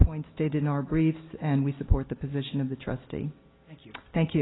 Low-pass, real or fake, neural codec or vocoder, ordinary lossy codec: 7.2 kHz; real; none; AAC, 16 kbps